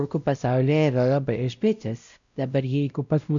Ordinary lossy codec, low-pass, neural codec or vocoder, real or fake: MP3, 96 kbps; 7.2 kHz; codec, 16 kHz, 0.5 kbps, X-Codec, WavLM features, trained on Multilingual LibriSpeech; fake